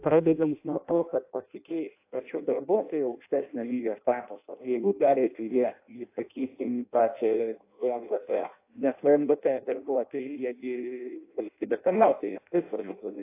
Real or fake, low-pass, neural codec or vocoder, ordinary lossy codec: fake; 3.6 kHz; codec, 16 kHz in and 24 kHz out, 0.6 kbps, FireRedTTS-2 codec; AAC, 24 kbps